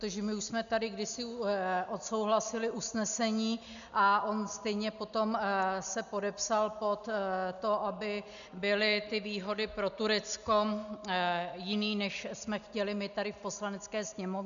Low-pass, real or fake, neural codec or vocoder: 7.2 kHz; real; none